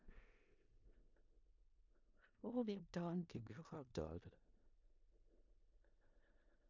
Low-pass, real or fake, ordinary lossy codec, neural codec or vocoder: 7.2 kHz; fake; MP3, 64 kbps; codec, 16 kHz in and 24 kHz out, 0.4 kbps, LongCat-Audio-Codec, four codebook decoder